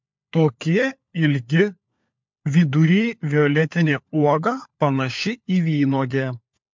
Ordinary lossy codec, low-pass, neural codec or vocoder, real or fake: MP3, 64 kbps; 7.2 kHz; codec, 16 kHz, 4 kbps, FunCodec, trained on LibriTTS, 50 frames a second; fake